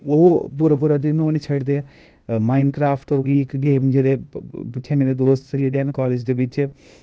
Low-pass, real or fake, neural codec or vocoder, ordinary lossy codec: none; fake; codec, 16 kHz, 0.8 kbps, ZipCodec; none